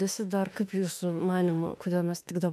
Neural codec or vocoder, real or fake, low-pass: autoencoder, 48 kHz, 32 numbers a frame, DAC-VAE, trained on Japanese speech; fake; 14.4 kHz